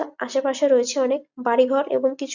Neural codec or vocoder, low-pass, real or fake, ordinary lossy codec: none; 7.2 kHz; real; none